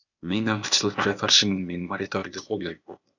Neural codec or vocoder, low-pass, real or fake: codec, 16 kHz, 0.8 kbps, ZipCodec; 7.2 kHz; fake